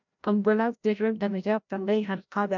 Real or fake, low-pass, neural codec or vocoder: fake; 7.2 kHz; codec, 16 kHz, 0.5 kbps, FreqCodec, larger model